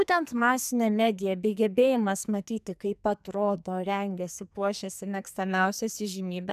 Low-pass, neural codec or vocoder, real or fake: 14.4 kHz; codec, 44.1 kHz, 2.6 kbps, SNAC; fake